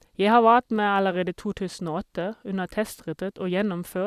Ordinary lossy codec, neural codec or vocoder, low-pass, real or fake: Opus, 64 kbps; vocoder, 44.1 kHz, 128 mel bands every 256 samples, BigVGAN v2; 14.4 kHz; fake